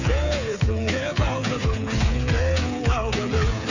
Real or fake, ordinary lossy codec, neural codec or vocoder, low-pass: fake; none; codec, 16 kHz, 8 kbps, FreqCodec, smaller model; 7.2 kHz